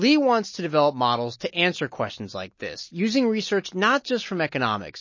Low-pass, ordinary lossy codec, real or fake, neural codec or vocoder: 7.2 kHz; MP3, 32 kbps; real; none